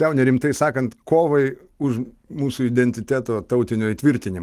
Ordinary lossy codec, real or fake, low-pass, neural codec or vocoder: Opus, 24 kbps; real; 14.4 kHz; none